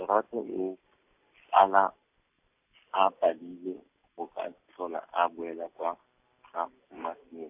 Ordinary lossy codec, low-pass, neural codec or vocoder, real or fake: AAC, 32 kbps; 3.6 kHz; none; real